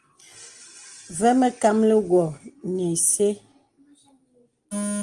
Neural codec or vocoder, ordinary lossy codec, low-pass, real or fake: none; Opus, 24 kbps; 10.8 kHz; real